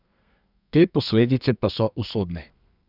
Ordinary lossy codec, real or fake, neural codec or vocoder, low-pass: none; fake; codec, 32 kHz, 1.9 kbps, SNAC; 5.4 kHz